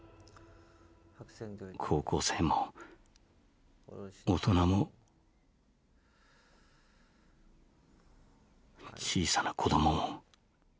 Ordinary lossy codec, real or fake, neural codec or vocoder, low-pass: none; real; none; none